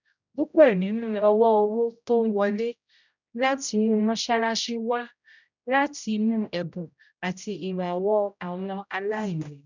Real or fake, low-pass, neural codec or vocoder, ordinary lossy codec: fake; 7.2 kHz; codec, 16 kHz, 0.5 kbps, X-Codec, HuBERT features, trained on general audio; none